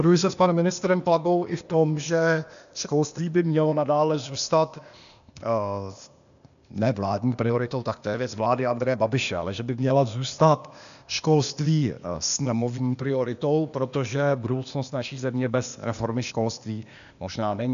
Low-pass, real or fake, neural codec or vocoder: 7.2 kHz; fake; codec, 16 kHz, 0.8 kbps, ZipCodec